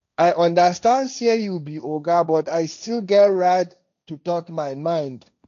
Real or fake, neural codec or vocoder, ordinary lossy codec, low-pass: fake; codec, 16 kHz, 1.1 kbps, Voila-Tokenizer; none; 7.2 kHz